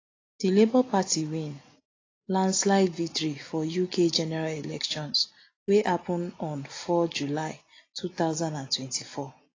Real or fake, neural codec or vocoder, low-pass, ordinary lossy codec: real; none; 7.2 kHz; AAC, 32 kbps